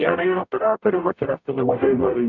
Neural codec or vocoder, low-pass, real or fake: codec, 44.1 kHz, 0.9 kbps, DAC; 7.2 kHz; fake